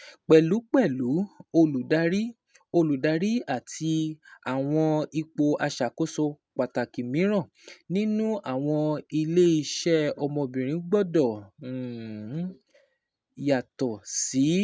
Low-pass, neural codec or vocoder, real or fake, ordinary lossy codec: none; none; real; none